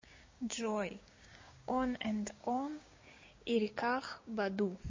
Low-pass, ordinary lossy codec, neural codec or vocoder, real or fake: 7.2 kHz; MP3, 32 kbps; codec, 16 kHz, 6 kbps, DAC; fake